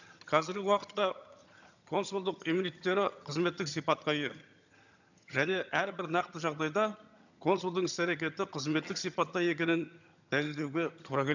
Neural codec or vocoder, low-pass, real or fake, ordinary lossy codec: vocoder, 22.05 kHz, 80 mel bands, HiFi-GAN; 7.2 kHz; fake; none